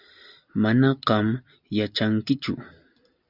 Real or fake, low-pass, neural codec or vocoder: real; 5.4 kHz; none